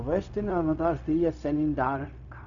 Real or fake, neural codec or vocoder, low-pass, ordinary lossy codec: fake; codec, 16 kHz, 0.4 kbps, LongCat-Audio-Codec; 7.2 kHz; none